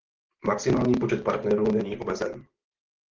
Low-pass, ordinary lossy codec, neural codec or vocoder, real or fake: 7.2 kHz; Opus, 16 kbps; none; real